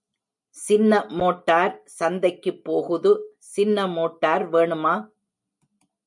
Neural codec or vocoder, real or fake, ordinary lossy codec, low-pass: none; real; MP3, 64 kbps; 10.8 kHz